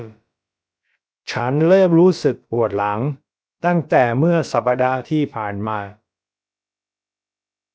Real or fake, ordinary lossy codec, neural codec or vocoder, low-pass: fake; none; codec, 16 kHz, about 1 kbps, DyCAST, with the encoder's durations; none